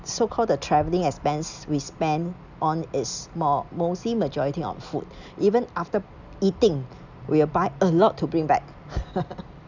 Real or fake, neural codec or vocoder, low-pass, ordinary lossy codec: real; none; 7.2 kHz; none